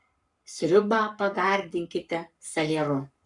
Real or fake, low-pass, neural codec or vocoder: fake; 10.8 kHz; codec, 44.1 kHz, 7.8 kbps, Pupu-Codec